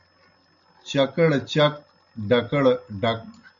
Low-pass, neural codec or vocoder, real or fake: 7.2 kHz; none; real